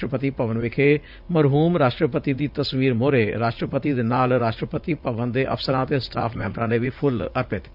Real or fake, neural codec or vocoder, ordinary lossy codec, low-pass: fake; vocoder, 44.1 kHz, 80 mel bands, Vocos; none; 5.4 kHz